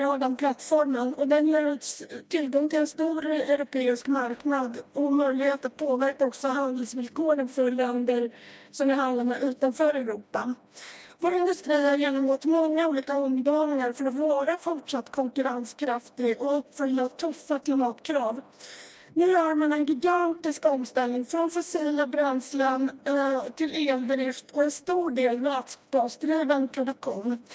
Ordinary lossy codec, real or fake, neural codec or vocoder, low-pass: none; fake; codec, 16 kHz, 1 kbps, FreqCodec, smaller model; none